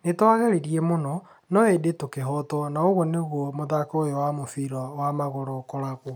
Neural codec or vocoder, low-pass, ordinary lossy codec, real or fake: none; none; none; real